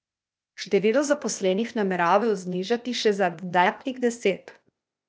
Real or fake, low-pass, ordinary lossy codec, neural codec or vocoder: fake; none; none; codec, 16 kHz, 0.8 kbps, ZipCodec